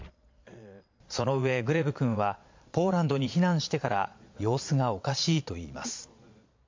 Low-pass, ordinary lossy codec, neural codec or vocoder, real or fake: 7.2 kHz; MP3, 48 kbps; vocoder, 22.05 kHz, 80 mel bands, Vocos; fake